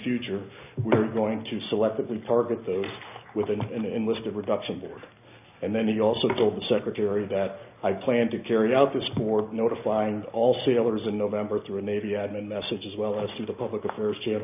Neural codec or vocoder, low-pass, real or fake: none; 3.6 kHz; real